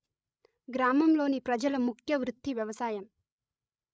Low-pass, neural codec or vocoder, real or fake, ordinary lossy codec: none; codec, 16 kHz, 16 kbps, FreqCodec, larger model; fake; none